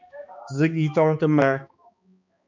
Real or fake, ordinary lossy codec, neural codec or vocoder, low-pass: fake; MP3, 64 kbps; codec, 16 kHz, 2 kbps, X-Codec, HuBERT features, trained on balanced general audio; 7.2 kHz